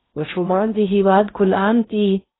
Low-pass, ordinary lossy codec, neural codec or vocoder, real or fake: 7.2 kHz; AAC, 16 kbps; codec, 16 kHz in and 24 kHz out, 0.6 kbps, FocalCodec, streaming, 4096 codes; fake